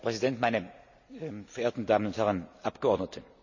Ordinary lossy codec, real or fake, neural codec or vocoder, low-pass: none; real; none; 7.2 kHz